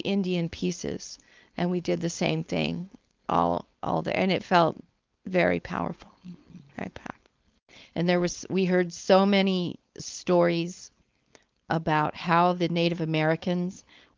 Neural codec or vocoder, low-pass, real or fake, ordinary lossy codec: codec, 16 kHz, 4.8 kbps, FACodec; 7.2 kHz; fake; Opus, 24 kbps